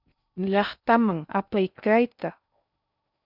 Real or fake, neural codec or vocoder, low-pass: fake; codec, 16 kHz in and 24 kHz out, 0.6 kbps, FocalCodec, streaming, 4096 codes; 5.4 kHz